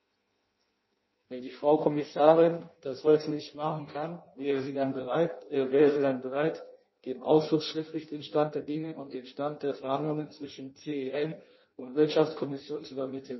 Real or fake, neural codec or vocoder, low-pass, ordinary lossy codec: fake; codec, 16 kHz in and 24 kHz out, 0.6 kbps, FireRedTTS-2 codec; 7.2 kHz; MP3, 24 kbps